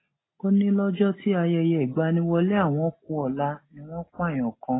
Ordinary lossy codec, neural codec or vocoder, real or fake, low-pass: AAC, 16 kbps; none; real; 7.2 kHz